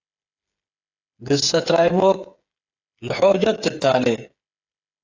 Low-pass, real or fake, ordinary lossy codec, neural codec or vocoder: 7.2 kHz; fake; AAC, 48 kbps; codec, 16 kHz, 8 kbps, FreqCodec, smaller model